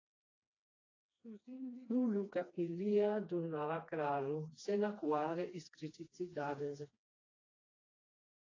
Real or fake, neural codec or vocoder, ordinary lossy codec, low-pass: fake; codec, 16 kHz, 2 kbps, FreqCodec, smaller model; AAC, 32 kbps; 7.2 kHz